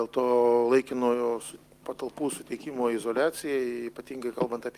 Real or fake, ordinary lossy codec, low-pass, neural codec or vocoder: real; Opus, 32 kbps; 14.4 kHz; none